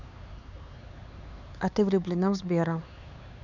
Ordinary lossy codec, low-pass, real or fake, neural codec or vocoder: none; 7.2 kHz; fake; codec, 16 kHz, 4 kbps, X-Codec, HuBERT features, trained on LibriSpeech